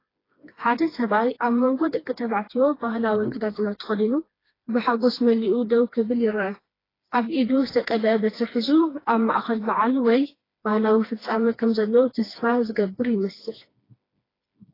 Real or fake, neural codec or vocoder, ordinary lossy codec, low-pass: fake; codec, 16 kHz, 2 kbps, FreqCodec, smaller model; AAC, 24 kbps; 5.4 kHz